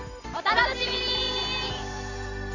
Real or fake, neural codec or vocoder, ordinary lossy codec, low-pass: real; none; none; 7.2 kHz